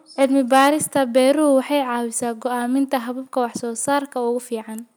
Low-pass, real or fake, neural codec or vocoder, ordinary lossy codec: none; real; none; none